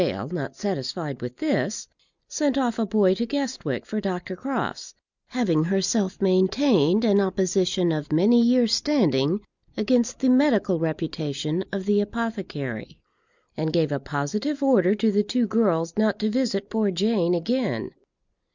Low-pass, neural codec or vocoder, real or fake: 7.2 kHz; none; real